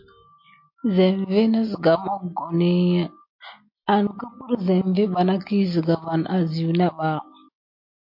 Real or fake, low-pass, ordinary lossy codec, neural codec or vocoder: real; 5.4 kHz; MP3, 32 kbps; none